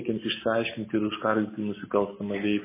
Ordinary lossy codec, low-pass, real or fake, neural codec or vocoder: MP3, 16 kbps; 3.6 kHz; real; none